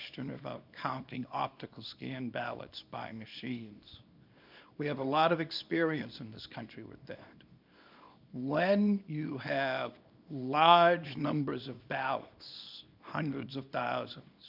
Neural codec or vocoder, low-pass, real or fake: codec, 24 kHz, 0.9 kbps, WavTokenizer, small release; 5.4 kHz; fake